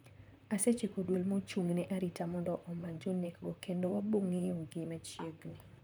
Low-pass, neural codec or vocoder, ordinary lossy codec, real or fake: none; vocoder, 44.1 kHz, 128 mel bands, Pupu-Vocoder; none; fake